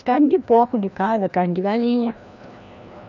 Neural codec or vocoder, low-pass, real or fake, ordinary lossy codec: codec, 16 kHz, 1 kbps, FreqCodec, larger model; 7.2 kHz; fake; none